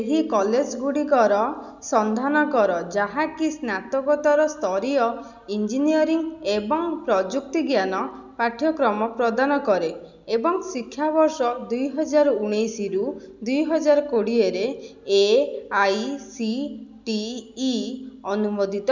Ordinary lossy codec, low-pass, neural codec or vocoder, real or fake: none; 7.2 kHz; none; real